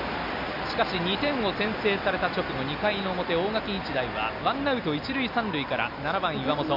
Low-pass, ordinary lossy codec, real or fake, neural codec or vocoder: 5.4 kHz; none; real; none